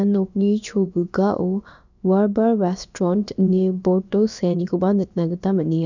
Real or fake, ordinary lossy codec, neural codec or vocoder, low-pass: fake; none; codec, 16 kHz, about 1 kbps, DyCAST, with the encoder's durations; 7.2 kHz